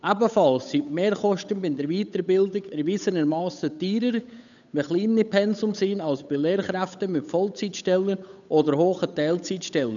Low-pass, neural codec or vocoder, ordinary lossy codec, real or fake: 7.2 kHz; codec, 16 kHz, 8 kbps, FunCodec, trained on Chinese and English, 25 frames a second; none; fake